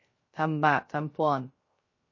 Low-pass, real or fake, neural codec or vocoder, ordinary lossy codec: 7.2 kHz; fake; codec, 16 kHz, 0.3 kbps, FocalCodec; MP3, 32 kbps